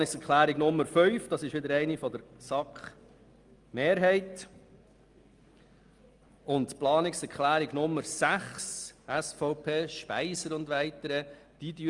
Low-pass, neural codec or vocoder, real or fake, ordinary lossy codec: 10.8 kHz; none; real; Opus, 32 kbps